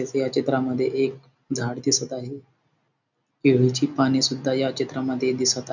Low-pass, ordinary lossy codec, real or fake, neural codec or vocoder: 7.2 kHz; none; real; none